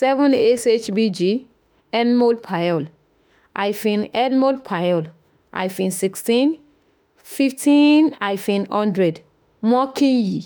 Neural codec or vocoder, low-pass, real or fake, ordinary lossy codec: autoencoder, 48 kHz, 32 numbers a frame, DAC-VAE, trained on Japanese speech; none; fake; none